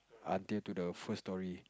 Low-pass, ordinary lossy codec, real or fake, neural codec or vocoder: none; none; real; none